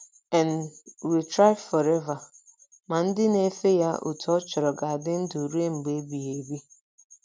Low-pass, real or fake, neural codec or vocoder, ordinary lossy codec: none; real; none; none